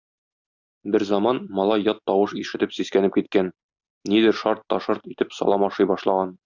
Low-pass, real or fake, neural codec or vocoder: 7.2 kHz; real; none